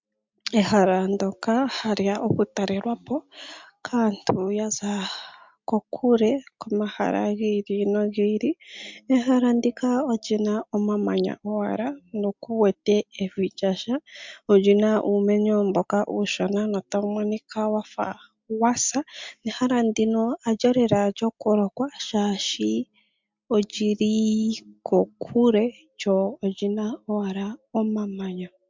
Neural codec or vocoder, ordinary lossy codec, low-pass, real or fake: none; MP3, 64 kbps; 7.2 kHz; real